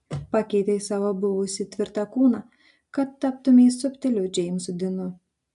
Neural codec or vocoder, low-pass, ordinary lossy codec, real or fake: none; 10.8 kHz; MP3, 64 kbps; real